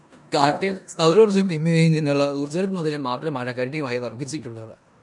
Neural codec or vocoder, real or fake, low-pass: codec, 16 kHz in and 24 kHz out, 0.9 kbps, LongCat-Audio-Codec, four codebook decoder; fake; 10.8 kHz